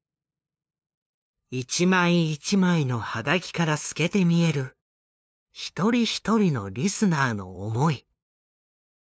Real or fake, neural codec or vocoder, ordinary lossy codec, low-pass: fake; codec, 16 kHz, 2 kbps, FunCodec, trained on LibriTTS, 25 frames a second; none; none